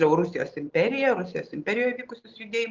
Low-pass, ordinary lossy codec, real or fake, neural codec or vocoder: 7.2 kHz; Opus, 32 kbps; real; none